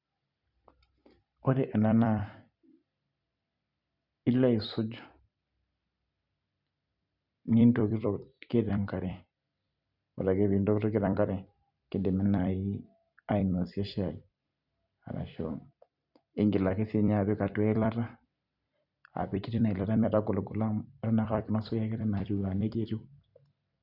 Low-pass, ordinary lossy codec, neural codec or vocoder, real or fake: 5.4 kHz; none; vocoder, 44.1 kHz, 128 mel bands every 256 samples, BigVGAN v2; fake